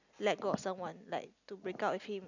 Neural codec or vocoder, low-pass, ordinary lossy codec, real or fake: none; 7.2 kHz; none; real